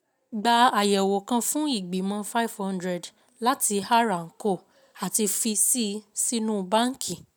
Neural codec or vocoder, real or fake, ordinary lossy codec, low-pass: none; real; none; none